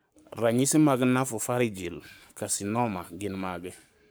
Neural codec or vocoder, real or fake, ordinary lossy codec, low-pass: codec, 44.1 kHz, 7.8 kbps, Pupu-Codec; fake; none; none